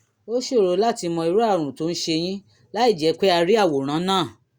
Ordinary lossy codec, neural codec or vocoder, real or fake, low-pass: Opus, 64 kbps; none; real; 19.8 kHz